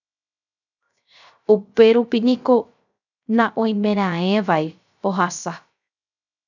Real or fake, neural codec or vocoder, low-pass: fake; codec, 16 kHz, 0.3 kbps, FocalCodec; 7.2 kHz